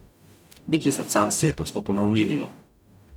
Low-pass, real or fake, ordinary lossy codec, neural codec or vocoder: none; fake; none; codec, 44.1 kHz, 0.9 kbps, DAC